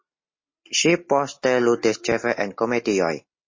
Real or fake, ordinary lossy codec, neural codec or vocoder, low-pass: real; MP3, 32 kbps; none; 7.2 kHz